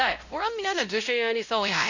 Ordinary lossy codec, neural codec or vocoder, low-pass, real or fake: none; codec, 16 kHz, 0.5 kbps, X-Codec, WavLM features, trained on Multilingual LibriSpeech; 7.2 kHz; fake